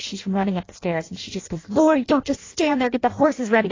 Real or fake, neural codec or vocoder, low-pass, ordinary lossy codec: fake; codec, 16 kHz in and 24 kHz out, 0.6 kbps, FireRedTTS-2 codec; 7.2 kHz; AAC, 32 kbps